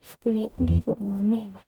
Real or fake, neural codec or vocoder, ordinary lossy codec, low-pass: fake; codec, 44.1 kHz, 0.9 kbps, DAC; none; 19.8 kHz